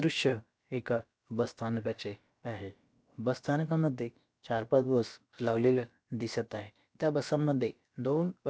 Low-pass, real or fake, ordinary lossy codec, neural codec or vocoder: none; fake; none; codec, 16 kHz, about 1 kbps, DyCAST, with the encoder's durations